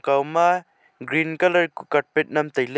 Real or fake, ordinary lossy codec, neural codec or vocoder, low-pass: real; none; none; none